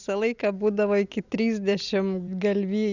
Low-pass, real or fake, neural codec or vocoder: 7.2 kHz; real; none